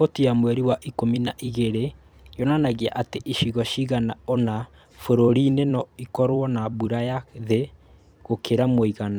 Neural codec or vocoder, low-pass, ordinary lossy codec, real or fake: vocoder, 44.1 kHz, 128 mel bands every 256 samples, BigVGAN v2; none; none; fake